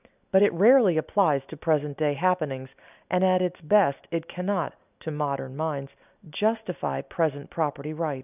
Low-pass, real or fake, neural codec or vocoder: 3.6 kHz; real; none